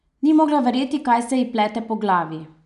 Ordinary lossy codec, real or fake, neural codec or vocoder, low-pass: none; real; none; 10.8 kHz